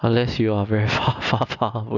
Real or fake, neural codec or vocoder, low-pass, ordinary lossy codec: real; none; 7.2 kHz; none